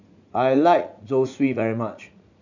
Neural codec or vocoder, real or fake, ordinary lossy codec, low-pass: vocoder, 44.1 kHz, 80 mel bands, Vocos; fake; none; 7.2 kHz